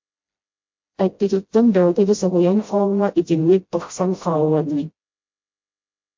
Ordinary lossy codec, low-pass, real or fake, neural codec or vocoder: MP3, 48 kbps; 7.2 kHz; fake; codec, 16 kHz, 0.5 kbps, FreqCodec, smaller model